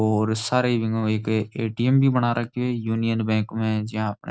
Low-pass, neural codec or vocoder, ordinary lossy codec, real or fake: none; none; none; real